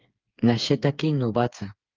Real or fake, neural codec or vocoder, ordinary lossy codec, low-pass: fake; codec, 16 kHz, 4 kbps, FreqCodec, smaller model; Opus, 24 kbps; 7.2 kHz